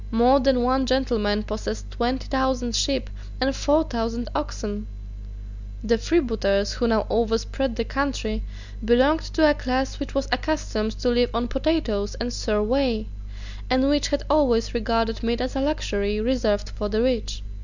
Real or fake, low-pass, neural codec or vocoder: real; 7.2 kHz; none